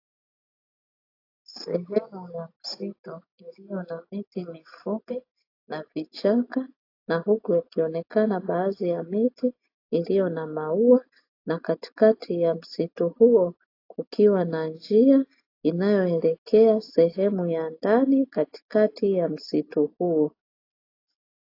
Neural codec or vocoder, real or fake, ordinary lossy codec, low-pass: none; real; AAC, 32 kbps; 5.4 kHz